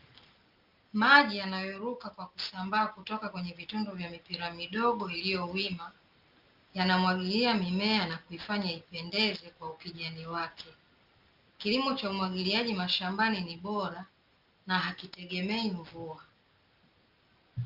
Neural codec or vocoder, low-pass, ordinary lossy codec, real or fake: none; 5.4 kHz; Opus, 24 kbps; real